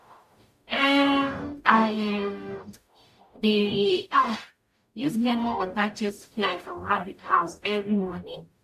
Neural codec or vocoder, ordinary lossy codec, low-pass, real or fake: codec, 44.1 kHz, 0.9 kbps, DAC; MP3, 64 kbps; 14.4 kHz; fake